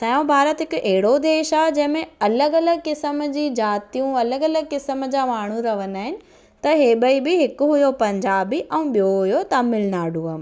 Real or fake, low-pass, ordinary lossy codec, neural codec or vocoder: real; none; none; none